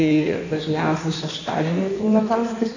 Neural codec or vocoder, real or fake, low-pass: codec, 16 kHz in and 24 kHz out, 1.1 kbps, FireRedTTS-2 codec; fake; 7.2 kHz